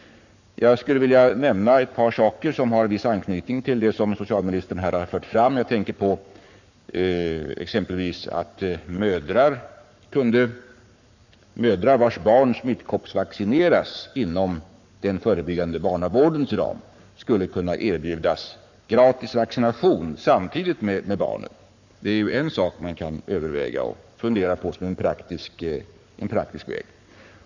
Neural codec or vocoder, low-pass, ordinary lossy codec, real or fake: codec, 44.1 kHz, 7.8 kbps, Pupu-Codec; 7.2 kHz; none; fake